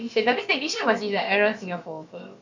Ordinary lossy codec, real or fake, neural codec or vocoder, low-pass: MP3, 48 kbps; fake; codec, 16 kHz, about 1 kbps, DyCAST, with the encoder's durations; 7.2 kHz